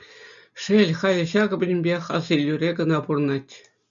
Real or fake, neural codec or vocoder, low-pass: real; none; 7.2 kHz